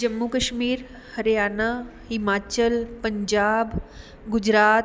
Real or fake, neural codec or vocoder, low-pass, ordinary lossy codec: real; none; none; none